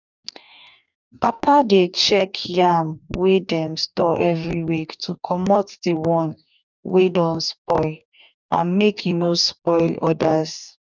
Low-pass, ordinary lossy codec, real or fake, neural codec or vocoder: 7.2 kHz; none; fake; codec, 44.1 kHz, 2.6 kbps, DAC